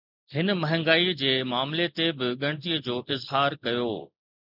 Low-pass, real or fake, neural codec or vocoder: 5.4 kHz; real; none